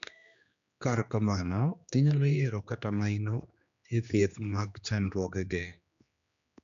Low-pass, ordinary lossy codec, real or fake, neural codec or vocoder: 7.2 kHz; none; fake; codec, 16 kHz, 2 kbps, X-Codec, HuBERT features, trained on general audio